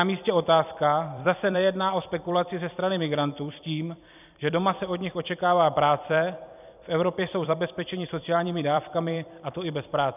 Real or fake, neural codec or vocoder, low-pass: fake; vocoder, 44.1 kHz, 128 mel bands every 256 samples, BigVGAN v2; 3.6 kHz